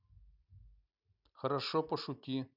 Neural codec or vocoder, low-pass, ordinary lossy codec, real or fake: none; 5.4 kHz; none; real